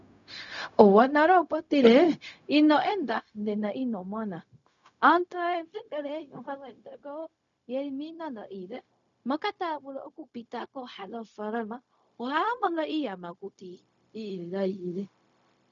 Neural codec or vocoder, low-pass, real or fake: codec, 16 kHz, 0.4 kbps, LongCat-Audio-Codec; 7.2 kHz; fake